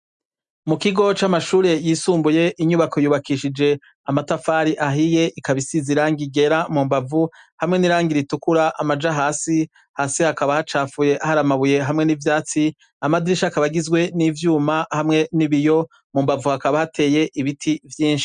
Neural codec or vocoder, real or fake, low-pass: none; real; 9.9 kHz